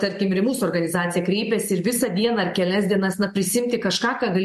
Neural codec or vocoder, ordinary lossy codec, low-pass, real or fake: none; MP3, 64 kbps; 14.4 kHz; real